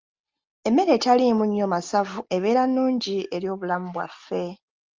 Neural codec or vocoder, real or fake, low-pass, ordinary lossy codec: none; real; 7.2 kHz; Opus, 32 kbps